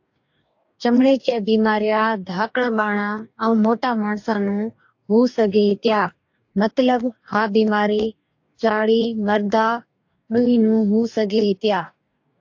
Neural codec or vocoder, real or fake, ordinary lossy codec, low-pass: codec, 44.1 kHz, 2.6 kbps, DAC; fake; AAC, 48 kbps; 7.2 kHz